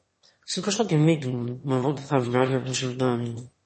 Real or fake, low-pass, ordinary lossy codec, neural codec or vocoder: fake; 9.9 kHz; MP3, 32 kbps; autoencoder, 22.05 kHz, a latent of 192 numbers a frame, VITS, trained on one speaker